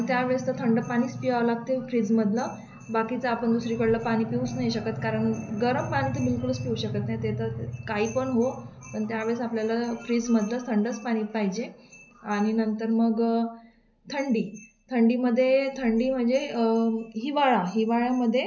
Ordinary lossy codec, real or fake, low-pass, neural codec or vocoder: none; real; 7.2 kHz; none